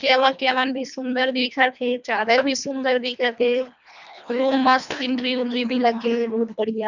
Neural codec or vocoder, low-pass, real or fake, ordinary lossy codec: codec, 24 kHz, 1.5 kbps, HILCodec; 7.2 kHz; fake; none